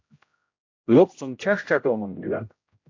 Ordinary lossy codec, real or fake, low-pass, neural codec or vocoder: AAC, 48 kbps; fake; 7.2 kHz; codec, 16 kHz, 0.5 kbps, X-Codec, HuBERT features, trained on general audio